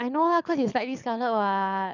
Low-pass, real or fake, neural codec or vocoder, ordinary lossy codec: 7.2 kHz; fake; codec, 24 kHz, 6 kbps, HILCodec; none